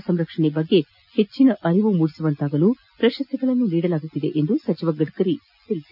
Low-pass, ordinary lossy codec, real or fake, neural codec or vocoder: 5.4 kHz; none; real; none